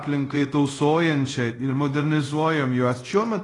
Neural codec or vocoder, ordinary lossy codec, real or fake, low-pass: codec, 24 kHz, 0.5 kbps, DualCodec; AAC, 32 kbps; fake; 10.8 kHz